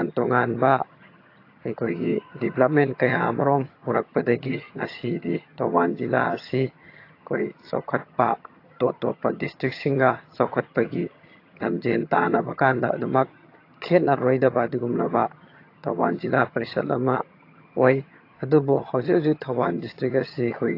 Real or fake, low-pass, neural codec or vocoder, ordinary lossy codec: fake; 5.4 kHz; vocoder, 22.05 kHz, 80 mel bands, HiFi-GAN; AAC, 32 kbps